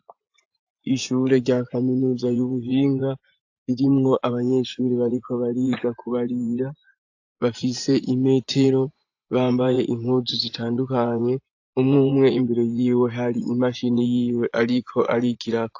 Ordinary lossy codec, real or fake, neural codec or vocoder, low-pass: AAC, 48 kbps; fake; vocoder, 24 kHz, 100 mel bands, Vocos; 7.2 kHz